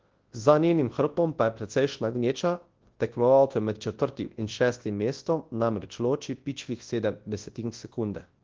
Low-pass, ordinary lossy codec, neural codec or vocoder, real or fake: 7.2 kHz; Opus, 16 kbps; codec, 24 kHz, 0.9 kbps, WavTokenizer, large speech release; fake